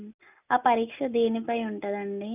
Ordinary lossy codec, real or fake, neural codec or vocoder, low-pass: none; real; none; 3.6 kHz